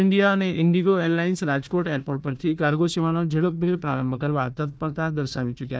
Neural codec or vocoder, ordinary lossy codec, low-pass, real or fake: codec, 16 kHz, 1 kbps, FunCodec, trained on Chinese and English, 50 frames a second; none; none; fake